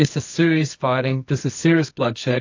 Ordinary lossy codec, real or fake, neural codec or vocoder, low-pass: AAC, 48 kbps; fake; codec, 24 kHz, 0.9 kbps, WavTokenizer, medium music audio release; 7.2 kHz